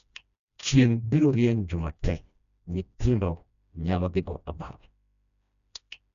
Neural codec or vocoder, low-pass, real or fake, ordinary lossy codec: codec, 16 kHz, 1 kbps, FreqCodec, smaller model; 7.2 kHz; fake; none